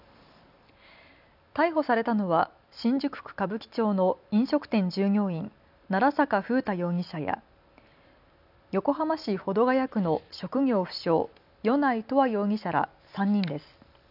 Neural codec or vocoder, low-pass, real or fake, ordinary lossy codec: none; 5.4 kHz; real; none